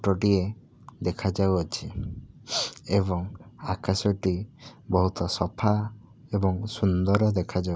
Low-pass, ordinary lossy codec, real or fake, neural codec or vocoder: none; none; real; none